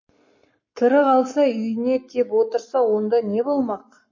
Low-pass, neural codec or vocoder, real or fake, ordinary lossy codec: 7.2 kHz; codec, 44.1 kHz, 7.8 kbps, Pupu-Codec; fake; MP3, 32 kbps